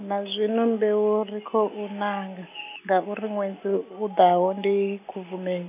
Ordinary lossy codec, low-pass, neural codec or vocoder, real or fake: none; 3.6 kHz; none; real